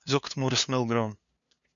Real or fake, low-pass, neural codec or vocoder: fake; 7.2 kHz; codec, 16 kHz, 2 kbps, FunCodec, trained on LibriTTS, 25 frames a second